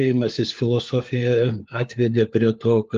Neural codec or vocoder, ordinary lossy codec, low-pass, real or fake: codec, 16 kHz, 4 kbps, FunCodec, trained on LibriTTS, 50 frames a second; Opus, 32 kbps; 7.2 kHz; fake